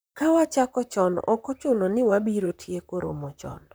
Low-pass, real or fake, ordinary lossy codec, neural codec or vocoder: none; fake; none; vocoder, 44.1 kHz, 128 mel bands, Pupu-Vocoder